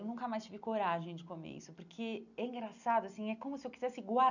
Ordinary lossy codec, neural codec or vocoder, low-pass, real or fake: none; none; 7.2 kHz; real